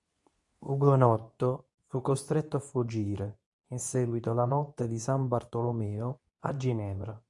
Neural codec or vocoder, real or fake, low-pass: codec, 24 kHz, 0.9 kbps, WavTokenizer, medium speech release version 2; fake; 10.8 kHz